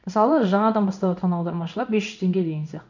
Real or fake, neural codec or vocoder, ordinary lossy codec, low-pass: fake; codec, 16 kHz in and 24 kHz out, 1 kbps, XY-Tokenizer; none; 7.2 kHz